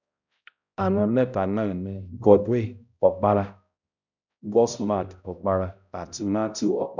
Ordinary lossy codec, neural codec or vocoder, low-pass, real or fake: none; codec, 16 kHz, 0.5 kbps, X-Codec, HuBERT features, trained on balanced general audio; 7.2 kHz; fake